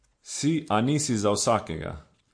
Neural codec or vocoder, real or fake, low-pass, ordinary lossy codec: none; real; 9.9 kHz; MP3, 48 kbps